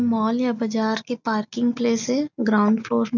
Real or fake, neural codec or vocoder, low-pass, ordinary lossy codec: real; none; 7.2 kHz; none